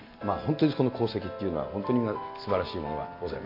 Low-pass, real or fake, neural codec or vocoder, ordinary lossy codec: 5.4 kHz; real; none; MP3, 32 kbps